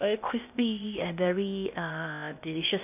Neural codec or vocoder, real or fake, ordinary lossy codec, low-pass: codec, 16 kHz, 0.8 kbps, ZipCodec; fake; none; 3.6 kHz